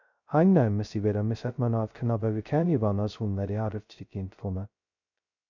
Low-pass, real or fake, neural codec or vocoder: 7.2 kHz; fake; codec, 16 kHz, 0.2 kbps, FocalCodec